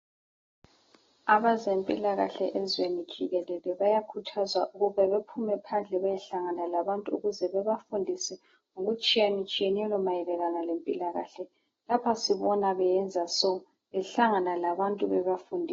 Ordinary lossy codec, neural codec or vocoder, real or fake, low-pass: AAC, 24 kbps; none; real; 7.2 kHz